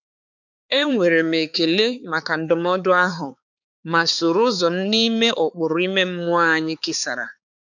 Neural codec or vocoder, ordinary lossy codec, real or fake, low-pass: codec, 16 kHz, 4 kbps, X-Codec, HuBERT features, trained on balanced general audio; none; fake; 7.2 kHz